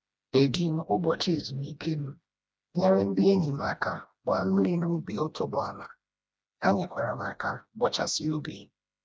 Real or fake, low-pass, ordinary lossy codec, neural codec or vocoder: fake; none; none; codec, 16 kHz, 1 kbps, FreqCodec, smaller model